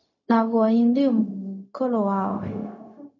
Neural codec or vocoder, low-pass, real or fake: codec, 16 kHz, 0.4 kbps, LongCat-Audio-Codec; 7.2 kHz; fake